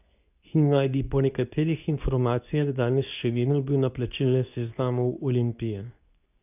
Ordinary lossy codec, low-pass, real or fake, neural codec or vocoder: none; 3.6 kHz; fake; codec, 24 kHz, 0.9 kbps, WavTokenizer, medium speech release version 2